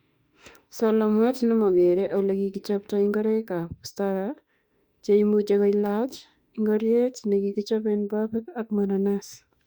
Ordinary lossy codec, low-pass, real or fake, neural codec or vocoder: Opus, 64 kbps; 19.8 kHz; fake; autoencoder, 48 kHz, 32 numbers a frame, DAC-VAE, trained on Japanese speech